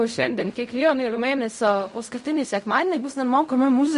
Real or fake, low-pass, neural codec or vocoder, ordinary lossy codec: fake; 10.8 kHz; codec, 16 kHz in and 24 kHz out, 0.4 kbps, LongCat-Audio-Codec, fine tuned four codebook decoder; MP3, 48 kbps